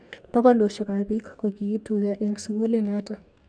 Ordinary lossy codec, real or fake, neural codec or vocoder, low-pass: none; fake; codec, 44.1 kHz, 2.6 kbps, DAC; 9.9 kHz